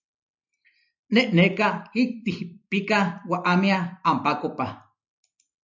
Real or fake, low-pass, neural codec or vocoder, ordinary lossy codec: real; 7.2 kHz; none; MP3, 64 kbps